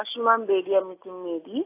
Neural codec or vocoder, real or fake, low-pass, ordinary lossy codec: none; real; 3.6 kHz; AAC, 24 kbps